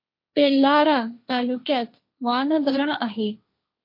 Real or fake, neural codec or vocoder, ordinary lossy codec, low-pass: fake; codec, 16 kHz, 1.1 kbps, Voila-Tokenizer; MP3, 32 kbps; 5.4 kHz